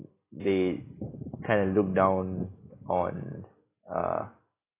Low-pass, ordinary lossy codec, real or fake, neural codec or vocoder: 3.6 kHz; AAC, 16 kbps; real; none